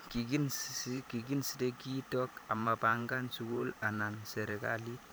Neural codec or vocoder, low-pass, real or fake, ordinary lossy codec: vocoder, 44.1 kHz, 128 mel bands every 512 samples, BigVGAN v2; none; fake; none